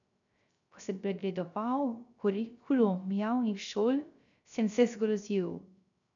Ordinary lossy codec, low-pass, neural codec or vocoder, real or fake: none; 7.2 kHz; codec, 16 kHz, 0.3 kbps, FocalCodec; fake